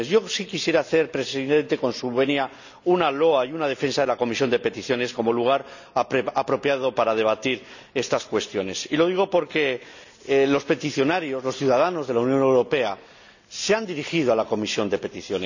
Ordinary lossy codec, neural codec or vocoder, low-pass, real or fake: none; none; 7.2 kHz; real